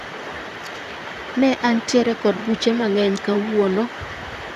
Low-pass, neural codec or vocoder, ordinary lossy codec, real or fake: 14.4 kHz; vocoder, 44.1 kHz, 128 mel bands, Pupu-Vocoder; none; fake